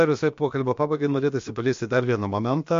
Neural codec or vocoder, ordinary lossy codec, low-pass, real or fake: codec, 16 kHz, about 1 kbps, DyCAST, with the encoder's durations; MP3, 48 kbps; 7.2 kHz; fake